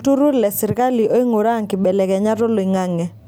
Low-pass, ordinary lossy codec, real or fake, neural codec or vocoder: none; none; real; none